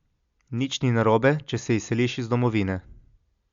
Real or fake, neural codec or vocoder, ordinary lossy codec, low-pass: real; none; Opus, 64 kbps; 7.2 kHz